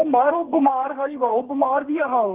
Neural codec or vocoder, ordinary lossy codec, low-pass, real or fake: codec, 32 kHz, 1.9 kbps, SNAC; Opus, 32 kbps; 3.6 kHz; fake